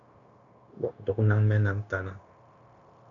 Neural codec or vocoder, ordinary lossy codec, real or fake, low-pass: codec, 16 kHz, 0.9 kbps, LongCat-Audio-Codec; MP3, 96 kbps; fake; 7.2 kHz